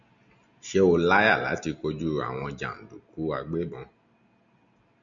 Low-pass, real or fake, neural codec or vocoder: 7.2 kHz; real; none